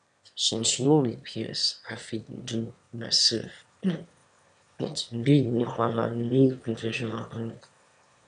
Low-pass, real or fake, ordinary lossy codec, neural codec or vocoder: 9.9 kHz; fake; none; autoencoder, 22.05 kHz, a latent of 192 numbers a frame, VITS, trained on one speaker